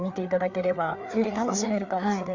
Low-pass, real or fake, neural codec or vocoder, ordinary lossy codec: 7.2 kHz; fake; codec, 16 kHz, 4 kbps, FreqCodec, larger model; Opus, 64 kbps